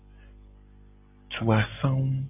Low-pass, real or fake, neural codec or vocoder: 3.6 kHz; real; none